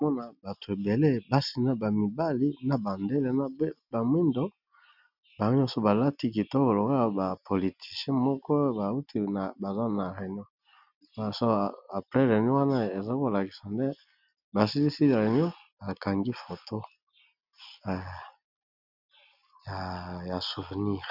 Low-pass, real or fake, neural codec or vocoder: 5.4 kHz; real; none